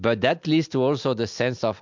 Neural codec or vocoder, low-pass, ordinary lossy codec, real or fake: none; 7.2 kHz; MP3, 64 kbps; real